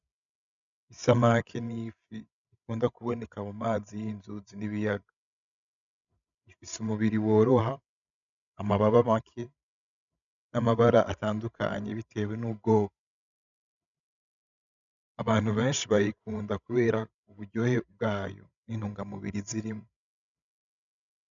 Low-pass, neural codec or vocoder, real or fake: 7.2 kHz; codec, 16 kHz, 16 kbps, FreqCodec, larger model; fake